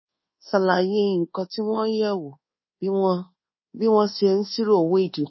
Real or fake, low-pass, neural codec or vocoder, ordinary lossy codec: fake; 7.2 kHz; codec, 24 kHz, 1.2 kbps, DualCodec; MP3, 24 kbps